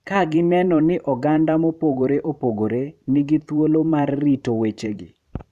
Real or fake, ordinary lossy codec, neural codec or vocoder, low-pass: fake; none; vocoder, 44.1 kHz, 128 mel bands every 512 samples, BigVGAN v2; 14.4 kHz